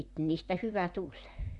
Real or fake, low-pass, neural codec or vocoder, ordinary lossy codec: fake; 10.8 kHz; vocoder, 44.1 kHz, 128 mel bands, Pupu-Vocoder; none